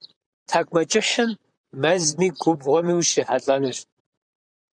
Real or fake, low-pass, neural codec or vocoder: fake; 9.9 kHz; vocoder, 44.1 kHz, 128 mel bands, Pupu-Vocoder